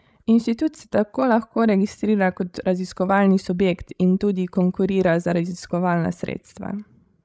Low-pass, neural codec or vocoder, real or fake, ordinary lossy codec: none; codec, 16 kHz, 16 kbps, FreqCodec, larger model; fake; none